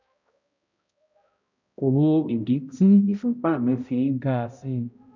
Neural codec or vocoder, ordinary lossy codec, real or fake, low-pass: codec, 16 kHz, 0.5 kbps, X-Codec, HuBERT features, trained on balanced general audio; none; fake; 7.2 kHz